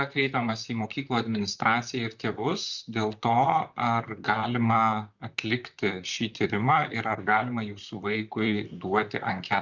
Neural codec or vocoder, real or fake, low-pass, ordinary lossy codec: vocoder, 44.1 kHz, 128 mel bands, Pupu-Vocoder; fake; 7.2 kHz; Opus, 64 kbps